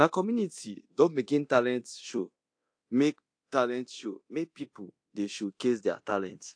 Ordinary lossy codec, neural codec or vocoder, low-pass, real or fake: AAC, 48 kbps; codec, 24 kHz, 0.9 kbps, DualCodec; 9.9 kHz; fake